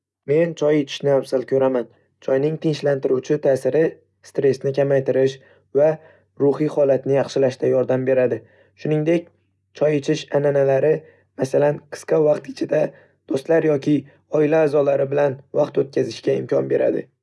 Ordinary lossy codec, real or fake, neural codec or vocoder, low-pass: none; real; none; none